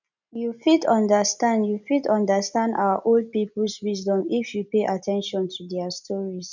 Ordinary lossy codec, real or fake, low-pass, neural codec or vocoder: none; real; 7.2 kHz; none